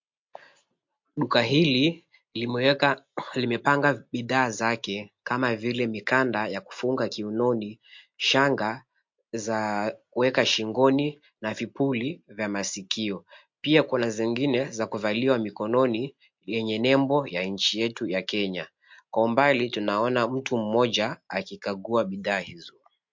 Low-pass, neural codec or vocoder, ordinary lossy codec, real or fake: 7.2 kHz; none; MP3, 48 kbps; real